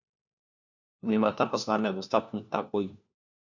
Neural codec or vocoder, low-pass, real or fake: codec, 16 kHz, 1 kbps, FunCodec, trained on LibriTTS, 50 frames a second; 7.2 kHz; fake